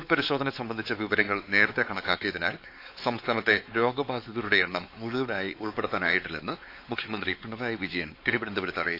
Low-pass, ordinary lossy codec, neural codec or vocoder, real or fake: 5.4 kHz; AAC, 32 kbps; codec, 16 kHz, 4 kbps, X-Codec, WavLM features, trained on Multilingual LibriSpeech; fake